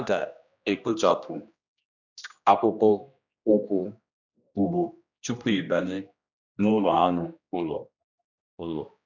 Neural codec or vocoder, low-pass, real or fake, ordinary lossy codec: codec, 16 kHz, 1 kbps, X-Codec, HuBERT features, trained on general audio; 7.2 kHz; fake; none